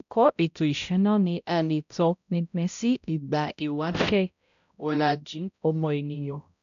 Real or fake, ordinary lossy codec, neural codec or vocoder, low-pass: fake; none; codec, 16 kHz, 0.5 kbps, X-Codec, HuBERT features, trained on balanced general audio; 7.2 kHz